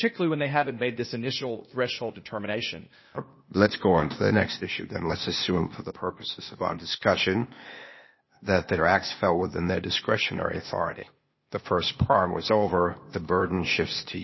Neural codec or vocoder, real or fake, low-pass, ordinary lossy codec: codec, 16 kHz, 0.8 kbps, ZipCodec; fake; 7.2 kHz; MP3, 24 kbps